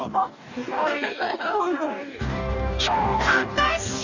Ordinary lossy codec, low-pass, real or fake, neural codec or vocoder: none; 7.2 kHz; fake; codec, 44.1 kHz, 2.6 kbps, DAC